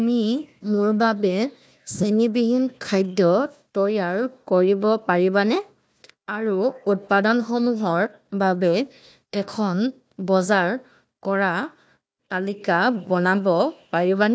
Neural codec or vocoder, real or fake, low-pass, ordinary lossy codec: codec, 16 kHz, 1 kbps, FunCodec, trained on Chinese and English, 50 frames a second; fake; none; none